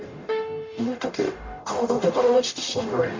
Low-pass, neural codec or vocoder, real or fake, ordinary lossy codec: 7.2 kHz; codec, 44.1 kHz, 0.9 kbps, DAC; fake; MP3, 64 kbps